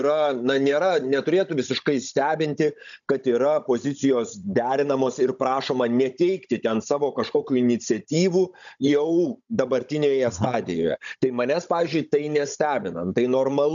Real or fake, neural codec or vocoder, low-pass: fake; codec, 16 kHz, 16 kbps, FunCodec, trained on Chinese and English, 50 frames a second; 7.2 kHz